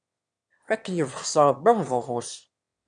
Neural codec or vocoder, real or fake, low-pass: autoencoder, 22.05 kHz, a latent of 192 numbers a frame, VITS, trained on one speaker; fake; 9.9 kHz